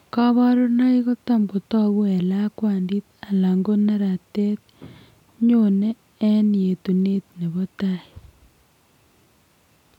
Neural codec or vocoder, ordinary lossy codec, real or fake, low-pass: none; none; real; 19.8 kHz